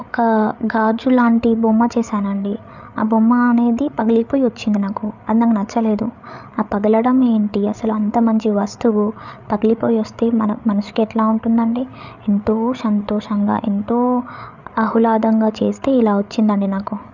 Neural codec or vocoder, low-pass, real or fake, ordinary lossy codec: codec, 16 kHz, 8 kbps, FreqCodec, larger model; 7.2 kHz; fake; none